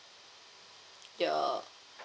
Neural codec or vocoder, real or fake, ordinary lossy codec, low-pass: none; real; none; none